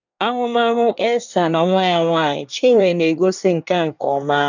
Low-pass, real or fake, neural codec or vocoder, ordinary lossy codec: 7.2 kHz; fake; codec, 24 kHz, 1 kbps, SNAC; none